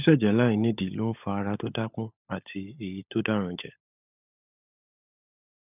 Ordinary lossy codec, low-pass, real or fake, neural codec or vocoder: none; 3.6 kHz; fake; codec, 16 kHz, 16 kbps, FunCodec, trained on LibriTTS, 50 frames a second